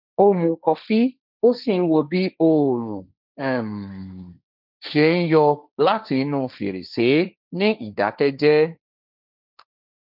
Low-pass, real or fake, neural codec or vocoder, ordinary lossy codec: 5.4 kHz; fake; codec, 16 kHz, 1.1 kbps, Voila-Tokenizer; none